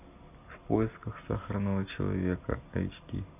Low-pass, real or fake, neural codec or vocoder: 3.6 kHz; real; none